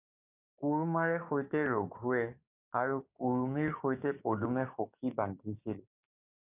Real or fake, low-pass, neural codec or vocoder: fake; 3.6 kHz; codec, 16 kHz, 6 kbps, DAC